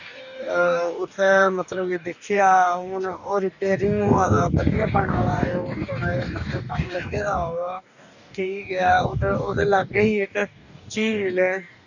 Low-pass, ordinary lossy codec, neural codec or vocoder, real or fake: 7.2 kHz; none; codec, 44.1 kHz, 2.6 kbps, DAC; fake